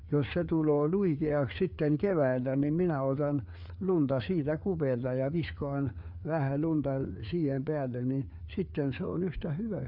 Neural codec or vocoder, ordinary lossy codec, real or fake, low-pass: codec, 16 kHz, 4 kbps, FreqCodec, larger model; none; fake; 5.4 kHz